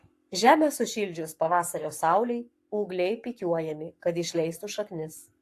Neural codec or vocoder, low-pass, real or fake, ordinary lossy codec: codec, 44.1 kHz, 7.8 kbps, Pupu-Codec; 14.4 kHz; fake; AAC, 64 kbps